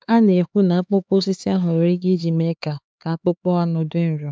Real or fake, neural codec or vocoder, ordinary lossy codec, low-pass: fake; codec, 16 kHz, 4 kbps, X-Codec, HuBERT features, trained on LibriSpeech; none; none